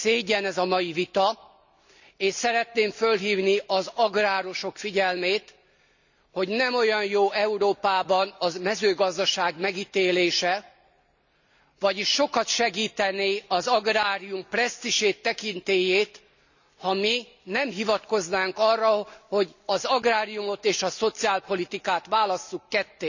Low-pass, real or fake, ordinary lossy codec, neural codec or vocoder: 7.2 kHz; real; none; none